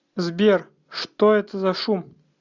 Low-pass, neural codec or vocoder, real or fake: 7.2 kHz; none; real